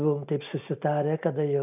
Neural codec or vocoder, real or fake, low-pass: none; real; 3.6 kHz